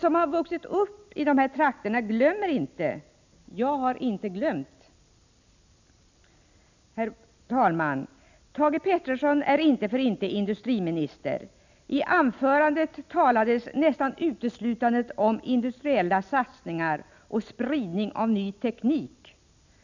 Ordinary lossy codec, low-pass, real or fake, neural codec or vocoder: none; 7.2 kHz; real; none